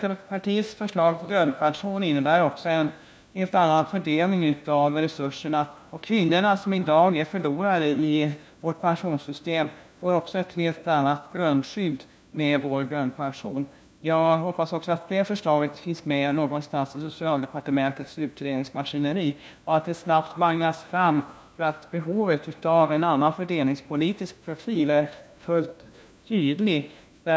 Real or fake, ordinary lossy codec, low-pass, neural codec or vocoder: fake; none; none; codec, 16 kHz, 1 kbps, FunCodec, trained on LibriTTS, 50 frames a second